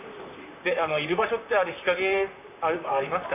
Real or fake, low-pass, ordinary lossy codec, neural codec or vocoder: fake; 3.6 kHz; none; vocoder, 44.1 kHz, 128 mel bands, Pupu-Vocoder